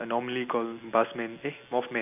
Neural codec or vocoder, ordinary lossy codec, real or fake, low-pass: none; none; real; 3.6 kHz